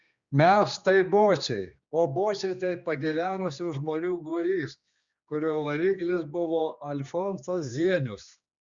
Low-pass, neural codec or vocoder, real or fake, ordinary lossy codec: 7.2 kHz; codec, 16 kHz, 2 kbps, X-Codec, HuBERT features, trained on general audio; fake; Opus, 64 kbps